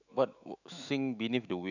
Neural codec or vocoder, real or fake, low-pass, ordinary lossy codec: autoencoder, 48 kHz, 128 numbers a frame, DAC-VAE, trained on Japanese speech; fake; 7.2 kHz; none